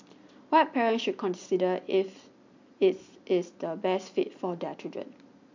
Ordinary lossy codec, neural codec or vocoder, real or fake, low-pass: MP3, 64 kbps; none; real; 7.2 kHz